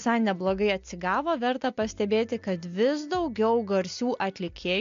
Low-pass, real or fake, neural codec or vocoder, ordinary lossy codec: 7.2 kHz; real; none; AAC, 64 kbps